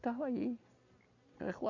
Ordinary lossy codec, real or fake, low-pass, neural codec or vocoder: Opus, 64 kbps; real; 7.2 kHz; none